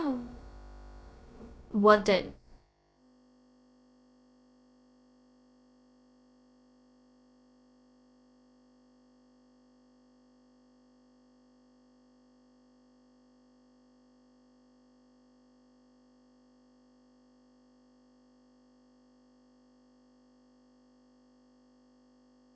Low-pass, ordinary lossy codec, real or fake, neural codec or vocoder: none; none; fake; codec, 16 kHz, about 1 kbps, DyCAST, with the encoder's durations